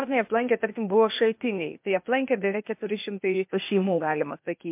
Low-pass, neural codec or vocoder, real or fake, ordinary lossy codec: 3.6 kHz; codec, 16 kHz, 0.8 kbps, ZipCodec; fake; MP3, 32 kbps